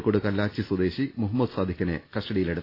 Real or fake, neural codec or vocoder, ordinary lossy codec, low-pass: real; none; AAC, 24 kbps; 5.4 kHz